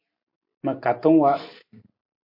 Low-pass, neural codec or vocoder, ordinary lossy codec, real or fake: 5.4 kHz; none; MP3, 32 kbps; real